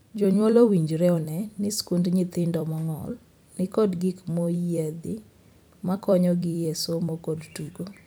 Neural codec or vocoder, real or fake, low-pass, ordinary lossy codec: vocoder, 44.1 kHz, 128 mel bands every 256 samples, BigVGAN v2; fake; none; none